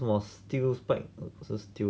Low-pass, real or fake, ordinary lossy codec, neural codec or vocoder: none; real; none; none